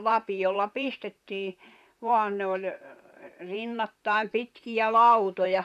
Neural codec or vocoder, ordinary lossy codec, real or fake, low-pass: vocoder, 44.1 kHz, 128 mel bands, Pupu-Vocoder; none; fake; 14.4 kHz